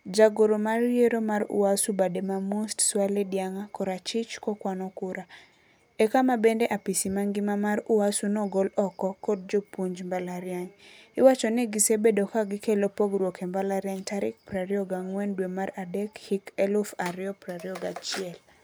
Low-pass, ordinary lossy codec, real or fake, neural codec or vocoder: none; none; real; none